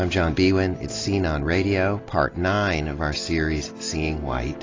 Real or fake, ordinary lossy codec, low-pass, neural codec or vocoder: real; AAC, 32 kbps; 7.2 kHz; none